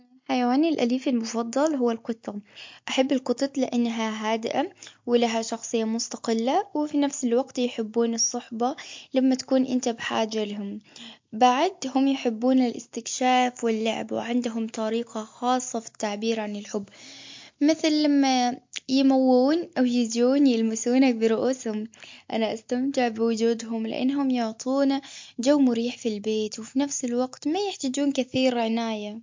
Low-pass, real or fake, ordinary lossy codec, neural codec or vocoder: 7.2 kHz; real; MP3, 48 kbps; none